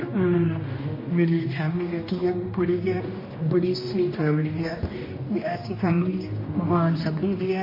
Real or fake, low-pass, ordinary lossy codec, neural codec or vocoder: fake; 5.4 kHz; MP3, 24 kbps; codec, 16 kHz, 1 kbps, X-Codec, HuBERT features, trained on general audio